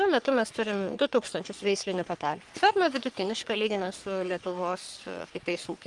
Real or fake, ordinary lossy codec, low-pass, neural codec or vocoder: fake; Opus, 32 kbps; 10.8 kHz; codec, 44.1 kHz, 3.4 kbps, Pupu-Codec